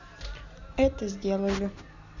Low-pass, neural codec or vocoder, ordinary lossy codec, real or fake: 7.2 kHz; none; none; real